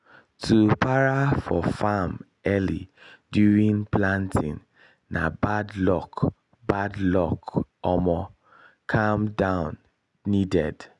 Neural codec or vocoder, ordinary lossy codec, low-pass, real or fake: none; none; 10.8 kHz; real